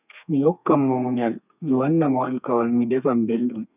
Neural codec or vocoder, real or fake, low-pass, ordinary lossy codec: codec, 32 kHz, 1.9 kbps, SNAC; fake; 3.6 kHz; none